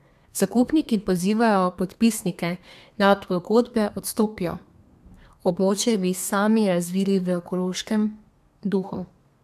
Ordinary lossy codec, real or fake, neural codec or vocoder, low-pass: AAC, 96 kbps; fake; codec, 32 kHz, 1.9 kbps, SNAC; 14.4 kHz